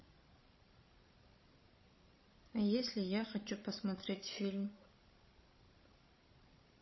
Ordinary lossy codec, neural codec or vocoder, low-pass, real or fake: MP3, 24 kbps; codec, 16 kHz, 16 kbps, FreqCodec, larger model; 7.2 kHz; fake